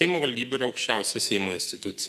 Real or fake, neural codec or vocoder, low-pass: fake; codec, 44.1 kHz, 2.6 kbps, SNAC; 14.4 kHz